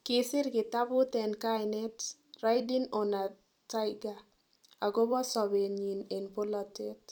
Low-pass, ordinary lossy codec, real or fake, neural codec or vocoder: 19.8 kHz; none; fake; vocoder, 44.1 kHz, 128 mel bands every 256 samples, BigVGAN v2